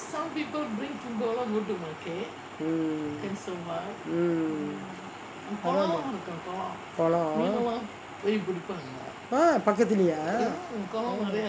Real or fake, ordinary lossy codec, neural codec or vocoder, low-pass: real; none; none; none